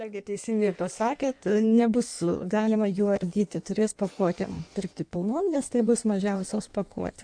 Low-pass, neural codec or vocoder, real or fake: 9.9 kHz; codec, 16 kHz in and 24 kHz out, 1.1 kbps, FireRedTTS-2 codec; fake